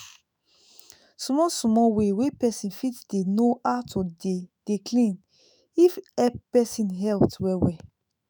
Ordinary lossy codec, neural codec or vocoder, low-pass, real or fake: none; autoencoder, 48 kHz, 128 numbers a frame, DAC-VAE, trained on Japanese speech; none; fake